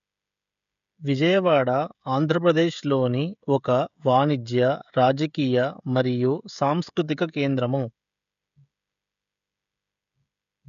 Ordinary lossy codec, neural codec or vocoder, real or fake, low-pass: none; codec, 16 kHz, 16 kbps, FreqCodec, smaller model; fake; 7.2 kHz